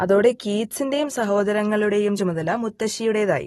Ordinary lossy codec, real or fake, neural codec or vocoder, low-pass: AAC, 32 kbps; real; none; 19.8 kHz